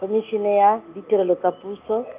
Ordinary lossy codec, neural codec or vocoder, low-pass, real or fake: Opus, 24 kbps; none; 3.6 kHz; real